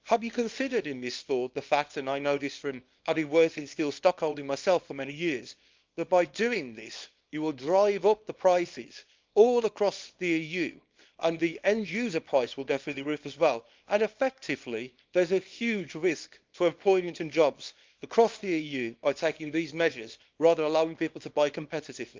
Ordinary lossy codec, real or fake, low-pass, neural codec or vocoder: Opus, 24 kbps; fake; 7.2 kHz; codec, 24 kHz, 0.9 kbps, WavTokenizer, small release